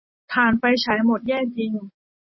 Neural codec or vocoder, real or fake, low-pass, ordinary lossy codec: none; real; 7.2 kHz; MP3, 24 kbps